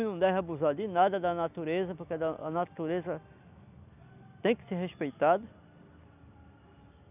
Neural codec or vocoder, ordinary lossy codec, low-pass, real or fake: none; none; 3.6 kHz; real